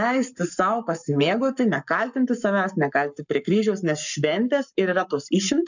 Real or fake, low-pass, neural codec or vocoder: fake; 7.2 kHz; codec, 44.1 kHz, 7.8 kbps, Pupu-Codec